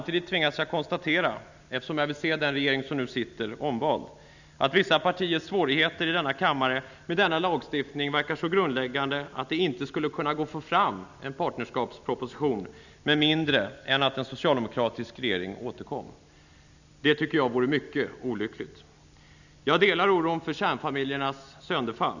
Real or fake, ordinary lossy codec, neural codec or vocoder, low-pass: real; none; none; 7.2 kHz